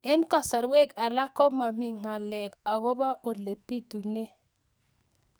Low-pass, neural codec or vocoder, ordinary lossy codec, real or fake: none; codec, 44.1 kHz, 2.6 kbps, SNAC; none; fake